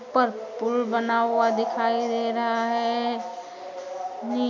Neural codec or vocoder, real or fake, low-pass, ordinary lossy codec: none; real; 7.2 kHz; MP3, 64 kbps